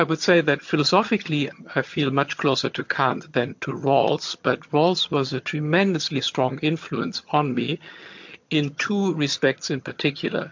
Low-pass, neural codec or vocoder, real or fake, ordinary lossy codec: 7.2 kHz; vocoder, 22.05 kHz, 80 mel bands, HiFi-GAN; fake; MP3, 48 kbps